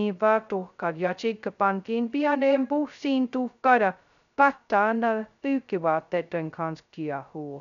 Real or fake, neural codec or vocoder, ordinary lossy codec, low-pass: fake; codec, 16 kHz, 0.2 kbps, FocalCodec; none; 7.2 kHz